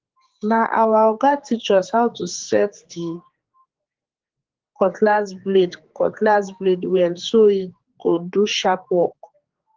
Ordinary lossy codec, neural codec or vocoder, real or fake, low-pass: Opus, 16 kbps; codec, 16 kHz, 4 kbps, X-Codec, HuBERT features, trained on general audio; fake; 7.2 kHz